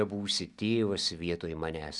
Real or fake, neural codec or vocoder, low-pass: real; none; 10.8 kHz